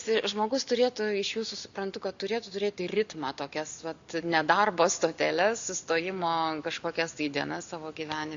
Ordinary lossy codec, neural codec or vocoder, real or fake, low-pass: Opus, 64 kbps; none; real; 7.2 kHz